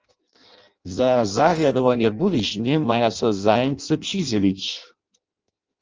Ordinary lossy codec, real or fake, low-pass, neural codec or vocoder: Opus, 24 kbps; fake; 7.2 kHz; codec, 16 kHz in and 24 kHz out, 0.6 kbps, FireRedTTS-2 codec